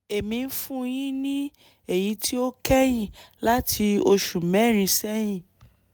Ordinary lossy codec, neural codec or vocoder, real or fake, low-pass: none; none; real; none